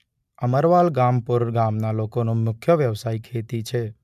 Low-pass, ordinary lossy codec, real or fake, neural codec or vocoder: 14.4 kHz; MP3, 96 kbps; real; none